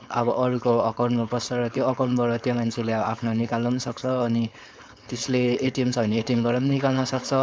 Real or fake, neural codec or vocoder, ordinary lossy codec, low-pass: fake; codec, 16 kHz, 4.8 kbps, FACodec; none; none